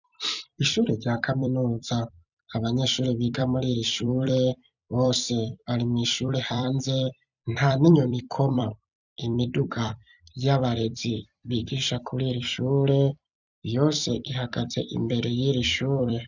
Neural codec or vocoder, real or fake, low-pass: vocoder, 44.1 kHz, 128 mel bands every 256 samples, BigVGAN v2; fake; 7.2 kHz